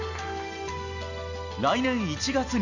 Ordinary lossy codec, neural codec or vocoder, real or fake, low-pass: none; none; real; 7.2 kHz